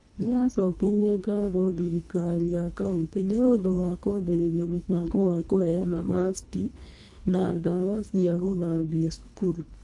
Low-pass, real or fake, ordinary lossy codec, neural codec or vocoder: 10.8 kHz; fake; AAC, 64 kbps; codec, 24 kHz, 1.5 kbps, HILCodec